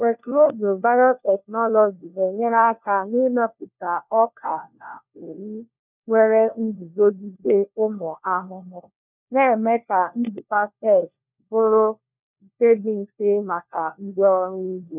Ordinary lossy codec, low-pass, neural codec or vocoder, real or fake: none; 3.6 kHz; codec, 16 kHz, 1 kbps, FunCodec, trained on LibriTTS, 50 frames a second; fake